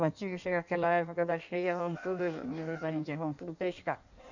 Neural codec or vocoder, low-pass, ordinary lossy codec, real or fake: codec, 16 kHz in and 24 kHz out, 1.1 kbps, FireRedTTS-2 codec; 7.2 kHz; none; fake